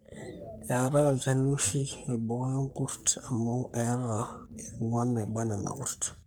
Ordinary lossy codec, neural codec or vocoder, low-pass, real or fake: none; codec, 44.1 kHz, 3.4 kbps, Pupu-Codec; none; fake